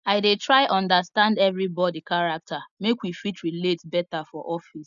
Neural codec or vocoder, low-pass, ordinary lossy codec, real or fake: none; 7.2 kHz; none; real